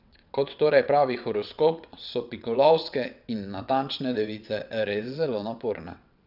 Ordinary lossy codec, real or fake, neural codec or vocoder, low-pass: none; fake; vocoder, 22.05 kHz, 80 mel bands, WaveNeXt; 5.4 kHz